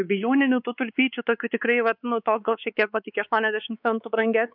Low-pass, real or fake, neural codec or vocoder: 5.4 kHz; fake; codec, 16 kHz, 4 kbps, X-Codec, WavLM features, trained on Multilingual LibriSpeech